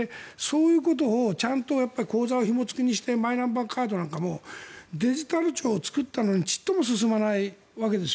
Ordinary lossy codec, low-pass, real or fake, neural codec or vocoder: none; none; real; none